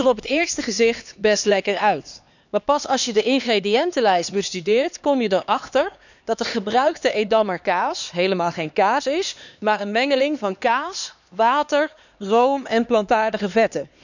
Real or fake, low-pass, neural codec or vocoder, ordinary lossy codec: fake; 7.2 kHz; codec, 16 kHz, 2 kbps, X-Codec, HuBERT features, trained on LibriSpeech; none